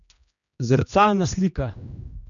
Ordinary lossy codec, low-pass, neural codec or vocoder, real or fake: none; 7.2 kHz; codec, 16 kHz, 1 kbps, X-Codec, HuBERT features, trained on general audio; fake